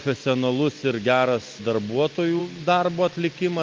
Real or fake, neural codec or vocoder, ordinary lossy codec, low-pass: real; none; Opus, 24 kbps; 7.2 kHz